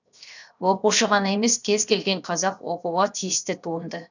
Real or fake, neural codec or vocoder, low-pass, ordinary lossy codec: fake; codec, 16 kHz, 0.7 kbps, FocalCodec; 7.2 kHz; none